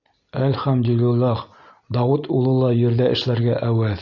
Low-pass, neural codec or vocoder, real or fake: 7.2 kHz; none; real